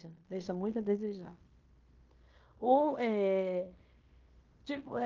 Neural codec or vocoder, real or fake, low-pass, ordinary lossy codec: codec, 16 kHz in and 24 kHz out, 0.9 kbps, LongCat-Audio-Codec, fine tuned four codebook decoder; fake; 7.2 kHz; Opus, 24 kbps